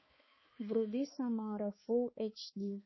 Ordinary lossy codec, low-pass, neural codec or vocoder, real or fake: MP3, 24 kbps; 7.2 kHz; codec, 16 kHz, 4 kbps, X-Codec, HuBERT features, trained on balanced general audio; fake